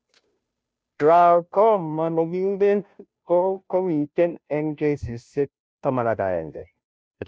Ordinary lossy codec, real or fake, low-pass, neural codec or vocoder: none; fake; none; codec, 16 kHz, 0.5 kbps, FunCodec, trained on Chinese and English, 25 frames a second